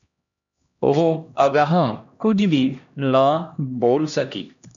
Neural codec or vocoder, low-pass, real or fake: codec, 16 kHz, 1 kbps, X-Codec, HuBERT features, trained on LibriSpeech; 7.2 kHz; fake